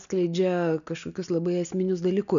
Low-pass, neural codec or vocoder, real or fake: 7.2 kHz; none; real